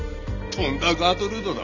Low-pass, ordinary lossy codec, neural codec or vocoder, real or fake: 7.2 kHz; none; none; real